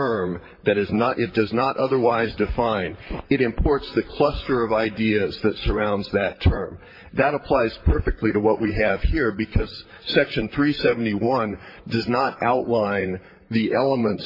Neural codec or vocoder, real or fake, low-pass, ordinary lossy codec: vocoder, 44.1 kHz, 128 mel bands, Pupu-Vocoder; fake; 5.4 kHz; MP3, 24 kbps